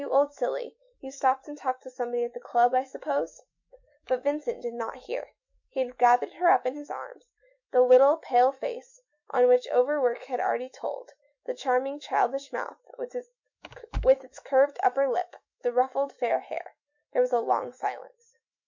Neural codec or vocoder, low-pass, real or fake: autoencoder, 48 kHz, 128 numbers a frame, DAC-VAE, trained on Japanese speech; 7.2 kHz; fake